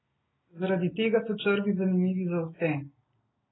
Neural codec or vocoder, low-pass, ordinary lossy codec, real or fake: none; 7.2 kHz; AAC, 16 kbps; real